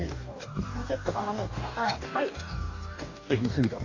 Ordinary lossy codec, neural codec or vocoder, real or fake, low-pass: AAC, 48 kbps; codec, 44.1 kHz, 2.6 kbps, DAC; fake; 7.2 kHz